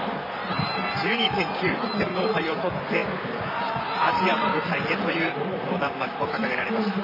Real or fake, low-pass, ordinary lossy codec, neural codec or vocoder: fake; 5.4 kHz; none; vocoder, 44.1 kHz, 128 mel bands, Pupu-Vocoder